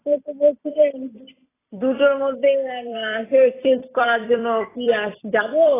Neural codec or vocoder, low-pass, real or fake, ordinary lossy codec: vocoder, 44.1 kHz, 128 mel bands every 256 samples, BigVGAN v2; 3.6 kHz; fake; AAC, 16 kbps